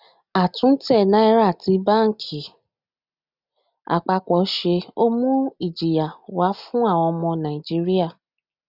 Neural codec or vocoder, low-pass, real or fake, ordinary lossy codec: none; 5.4 kHz; real; none